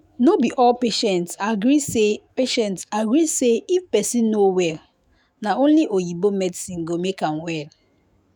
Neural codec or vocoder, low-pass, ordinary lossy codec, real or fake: autoencoder, 48 kHz, 128 numbers a frame, DAC-VAE, trained on Japanese speech; none; none; fake